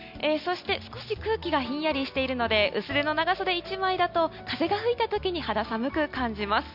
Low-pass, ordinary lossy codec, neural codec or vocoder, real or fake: 5.4 kHz; none; none; real